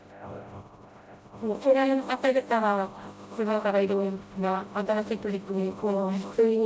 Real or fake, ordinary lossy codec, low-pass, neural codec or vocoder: fake; none; none; codec, 16 kHz, 0.5 kbps, FreqCodec, smaller model